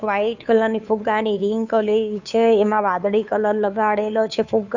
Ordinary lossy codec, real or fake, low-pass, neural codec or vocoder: none; fake; 7.2 kHz; codec, 16 kHz, 2 kbps, FunCodec, trained on Chinese and English, 25 frames a second